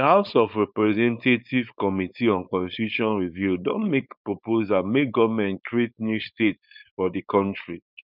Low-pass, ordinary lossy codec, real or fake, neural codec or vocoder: 5.4 kHz; none; fake; codec, 16 kHz, 4.8 kbps, FACodec